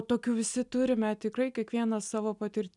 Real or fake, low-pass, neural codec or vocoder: real; 10.8 kHz; none